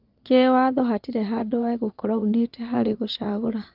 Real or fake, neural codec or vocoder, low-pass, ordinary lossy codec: fake; vocoder, 22.05 kHz, 80 mel bands, Vocos; 5.4 kHz; Opus, 24 kbps